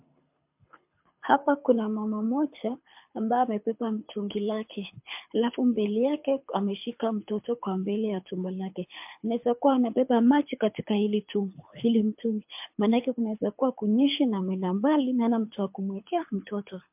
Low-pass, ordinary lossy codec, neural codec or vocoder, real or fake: 3.6 kHz; MP3, 32 kbps; codec, 24 kHz, 6 kbps, HILCodec; fake